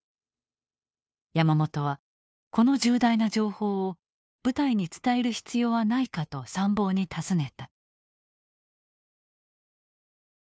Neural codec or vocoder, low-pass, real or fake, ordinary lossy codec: codec, 16 kHz, 2 kbps, FunCodec, trained on Chinese and English, 25 frames a second; none; fake; none